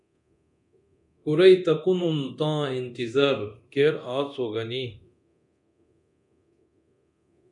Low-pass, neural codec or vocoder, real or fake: 10.8 kHz; codec, 24 kHz, 0.9 kbps, DualCodec; fake